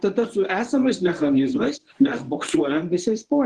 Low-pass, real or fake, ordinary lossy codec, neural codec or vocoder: 10.8 kHz; fake; Opus, 16 kbps; codec, 24 kHz, 0.9 kbps, WavTokenizer, medium speech release version 1